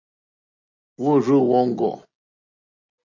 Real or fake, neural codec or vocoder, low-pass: real; none; 7.2 kHz